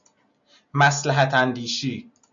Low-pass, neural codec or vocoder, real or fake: 7.2 kHz; none; real